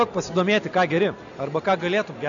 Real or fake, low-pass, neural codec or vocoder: real; 7.2 kHz; none